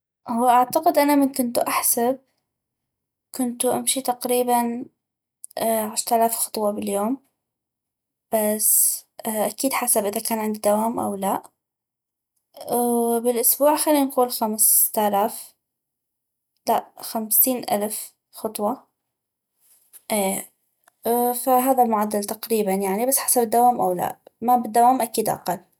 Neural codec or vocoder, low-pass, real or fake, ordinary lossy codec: none; none; real; none